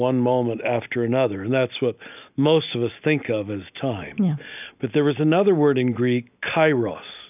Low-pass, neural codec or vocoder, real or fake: 3.6 kHz; none; real